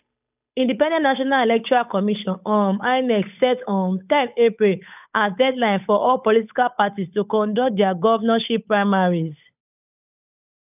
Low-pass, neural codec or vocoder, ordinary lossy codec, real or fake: 3.6 kHz; codec, 16 kHz, 8 kbps, FunCodec, trained on Chinese and English, 25 frames a second; none; fake